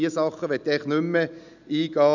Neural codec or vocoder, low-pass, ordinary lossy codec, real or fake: none; 7.2 kHz; none; real